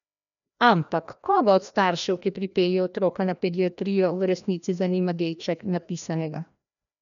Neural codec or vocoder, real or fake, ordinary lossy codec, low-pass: codec, 16 kHz, 1 kbps, FreqCodec, larger model; fake; none; 7.2 kHz